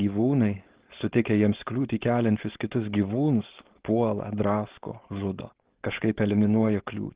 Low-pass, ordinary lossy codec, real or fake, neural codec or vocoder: 3.6 kHz; Opus, 16 kbps; fake; codec, 16 kHz, 4.8 kbps, FACodec